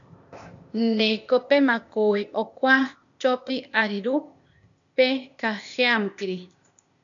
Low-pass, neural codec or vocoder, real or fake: 7.2 kHz; codec, 16 kHz, 0.8 kbps, ZipCodec; fake